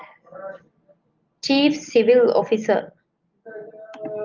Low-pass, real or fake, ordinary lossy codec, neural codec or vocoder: 7.2 kHz; real; Opus, 24 kbps; none